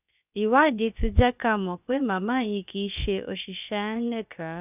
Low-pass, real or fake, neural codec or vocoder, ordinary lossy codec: 3.6 kHz; fake; codec, 16 kHz, about 1 kbps, DyCAST, with the encoder's durations; none